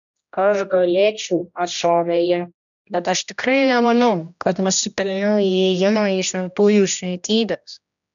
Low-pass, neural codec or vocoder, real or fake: 7.2 kHz; codec, 16 kHz, 1 kbps, X-Codec, HuBERT features, trained on general audio; fake